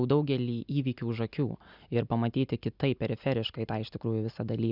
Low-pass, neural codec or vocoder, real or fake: 5.4 kHz; none; real